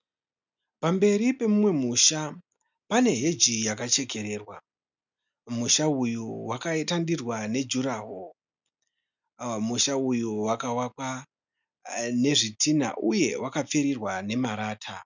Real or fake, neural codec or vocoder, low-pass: real; none; 7.2 kHz